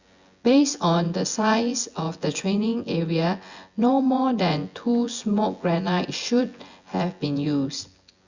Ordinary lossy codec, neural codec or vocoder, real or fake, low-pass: Opus, 64 kbps; vocoder, 24 kHz, 100 mel bands, Vocos; fake; 7.2 kHz